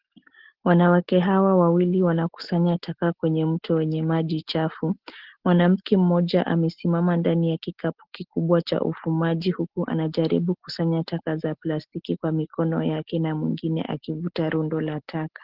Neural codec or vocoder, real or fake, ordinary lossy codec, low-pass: none; real; Opus, 16 kbps; 5.4 kHz